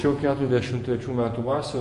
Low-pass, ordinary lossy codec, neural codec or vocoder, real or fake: 14.4 kHz; MP3, 48 kbps; vocoder, 48 kHz, 128 mel bands, Vocos; fake